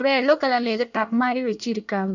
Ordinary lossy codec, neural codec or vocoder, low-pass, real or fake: none; codec, 24 kHz, 1 kbps, SNAC; 7.2 kHz; fake